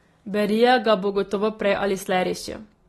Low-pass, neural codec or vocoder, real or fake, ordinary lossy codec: 19.8 kHz; none; real; AAC, 32 kbps